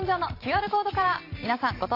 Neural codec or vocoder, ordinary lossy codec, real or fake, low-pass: vocoder, 44.1 kHz, 128 mel bands every 512 samples, BigVGAN v2; AAC, 32 kbps; fake; 5.4 kHz